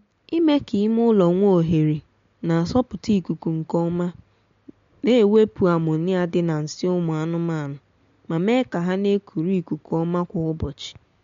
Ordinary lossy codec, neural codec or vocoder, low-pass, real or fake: MP3, 48 kbps; none; 7.2 kHz; real